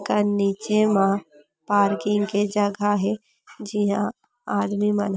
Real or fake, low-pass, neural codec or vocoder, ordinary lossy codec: real; none; none; none